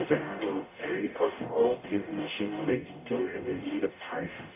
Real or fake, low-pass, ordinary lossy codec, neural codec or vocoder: fake; 3.6 kHz; none; codec, 44.1 kHz, 0.9 kbps, DAC